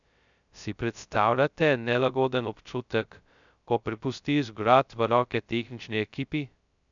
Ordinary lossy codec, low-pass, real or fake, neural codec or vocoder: none; 7.2 kHz; fake; codec, 16 kHz, 0.2 kbps, FocalCodec